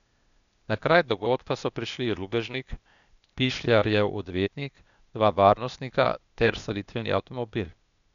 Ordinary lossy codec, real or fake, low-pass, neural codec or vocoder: none; fake; 7.2 kHz; codec, 16 kHz, 0.8 kbps, ZipCodec